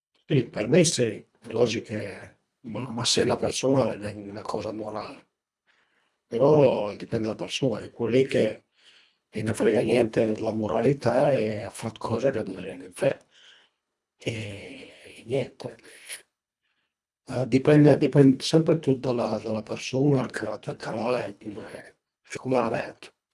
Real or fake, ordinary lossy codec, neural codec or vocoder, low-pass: fake; none; codec, 24 kHz, 1.5 kbps, HILCodec; none